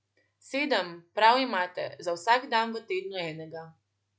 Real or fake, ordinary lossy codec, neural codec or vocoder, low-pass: real; none; none; none